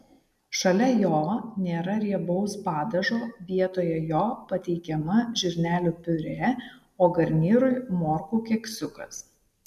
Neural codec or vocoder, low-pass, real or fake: none; 14.4 kHz; real